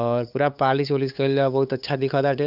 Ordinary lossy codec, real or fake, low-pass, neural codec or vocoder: none; fake; 5.4 kHz; codec, 16 kHz, 8 kbps, FunCodec, trained on LibriTTS, 25 frames a second